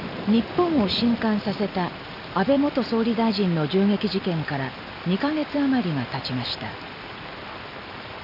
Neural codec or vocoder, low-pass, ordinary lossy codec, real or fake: none; 5.4 kHz; none; real